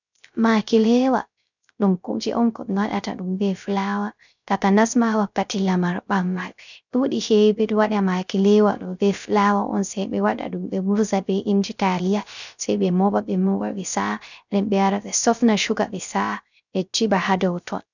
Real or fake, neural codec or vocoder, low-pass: fake; codec, 16 kHz, 0.3 kbps, FocalCodec; 7.2 kHz